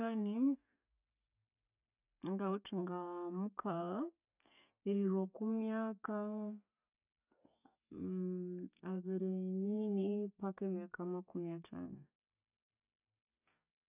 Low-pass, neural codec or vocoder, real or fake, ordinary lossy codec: 3.6 kHz; none; real; none